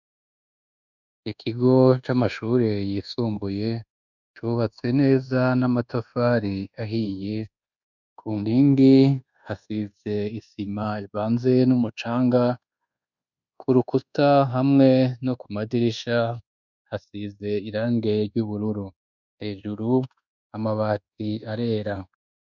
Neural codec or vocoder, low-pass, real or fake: codec, 24 kHz, 1.2 kbps, DualCodec; 7.2 kHz; fake